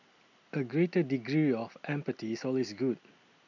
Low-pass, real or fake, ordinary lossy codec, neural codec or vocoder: 7.2 kHz; real; none; none